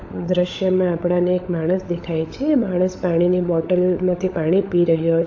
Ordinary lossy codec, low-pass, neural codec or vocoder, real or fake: AAC, 48 kbps; 7.2 kHz; codec, 16 kHz, 16 kbps, FunCodec, trained on LibriTTS, 50 frames a second; fake